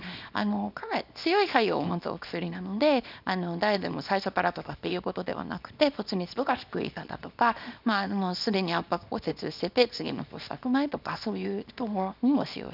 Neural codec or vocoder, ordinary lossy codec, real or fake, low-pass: codec, 24 kHz, 0.9 kbps, WavTokenizer, small release; none; fake; 5.4 kHz